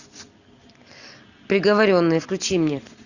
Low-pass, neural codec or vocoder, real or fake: 7.2 kHz; none; real